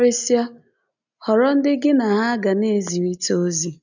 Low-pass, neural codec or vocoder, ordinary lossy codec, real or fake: 7.2 kHz; none; none; real